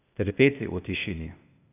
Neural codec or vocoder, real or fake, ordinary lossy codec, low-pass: codec, 16 kHz, 0.8 kbps, ZipCodec; fake; none; 3.6 kHz